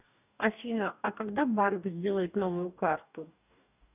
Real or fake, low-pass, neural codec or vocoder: fake; 3.6 kHz; codec, 44.1 kHz, 2.6 kbps, DAC